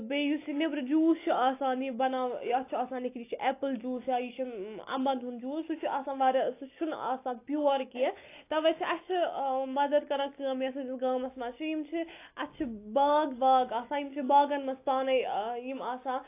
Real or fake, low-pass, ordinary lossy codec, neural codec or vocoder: real; 3.6 kHz; AAC, 24 kbps; none